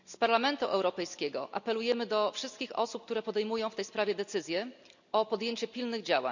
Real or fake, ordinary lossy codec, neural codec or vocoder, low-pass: real; none; none; 7.2 kHz